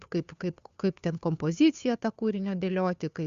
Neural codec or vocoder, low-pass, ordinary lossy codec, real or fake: codec, 16 kHz, 2 kbps, FunCodec, trained on Chinese and English, 25 frames a second; 7.2 kHz; Opus, 64 kbps; fake